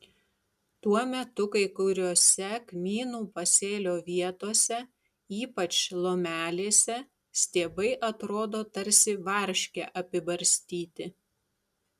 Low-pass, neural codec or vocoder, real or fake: 14.4 kHz; none; real